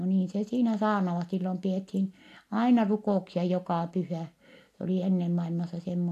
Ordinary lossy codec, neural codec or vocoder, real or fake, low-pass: AAC, 64 kbps; none; real; 14.4 kHz